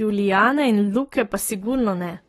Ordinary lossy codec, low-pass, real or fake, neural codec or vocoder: AAC, 32 kbps; 19.8 kHz; fake; codec, 44.1 kHz, 7.8 kbps, Pupu-Codec